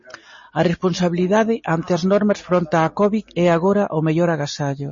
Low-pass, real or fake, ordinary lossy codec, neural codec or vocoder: 7.2 kHz; real; MP3, 32 kbps; none